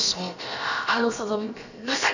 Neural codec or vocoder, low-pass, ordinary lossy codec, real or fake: codec, 16 kHz, about 1 kbps, DyCAST, with the encoder's durations; 7.2 kHz; none; fake